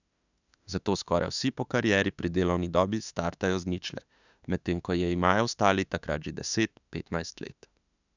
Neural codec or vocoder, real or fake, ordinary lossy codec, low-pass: autoencoder, 48 kHz, 32 numbers a frame, DAC-VAE, trained on Japanese speech; fake; none; 7.2 kHz